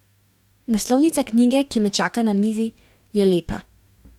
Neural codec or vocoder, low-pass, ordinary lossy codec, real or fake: codec, 44.1 kHz, 2.6 kbps, DAC; 19.8 kHz; none; fake